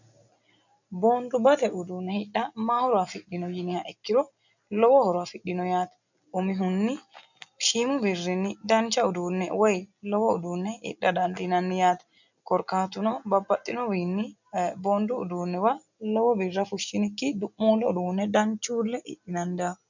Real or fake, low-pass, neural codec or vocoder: real; 7.2 kHz; none